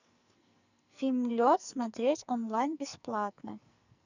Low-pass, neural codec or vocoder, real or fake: 7.2 kHz; codec, 44.1 kHz, 2.6 kbps, SNAC; fake